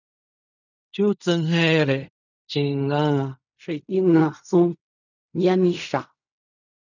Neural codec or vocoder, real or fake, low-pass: codec, 16 kHz in and 24 kHz out, 0.4 kbps, LongCat-Audio-Codec, fine tuned four codebook decoder; fake; 7.2 kHz